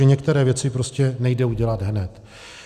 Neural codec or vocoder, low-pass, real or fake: none; 14.4 kHz; real